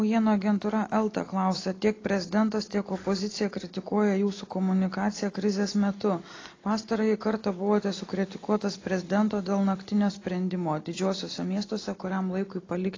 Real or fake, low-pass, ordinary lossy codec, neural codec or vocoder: real; 7.2 kHz; AAC, 32 kbps; none